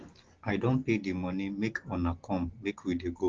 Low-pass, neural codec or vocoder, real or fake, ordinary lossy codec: 7.2 kHz; none; real; Opus, 16 kbps